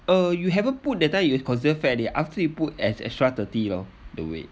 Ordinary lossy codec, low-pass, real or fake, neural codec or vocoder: none; none; real; none